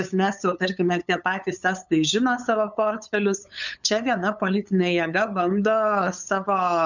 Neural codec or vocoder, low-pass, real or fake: codec, 16 kHz, 8 kbps, FunCodec, trained on LibriTTS, 25 frames a second; 7.2 kHz; fake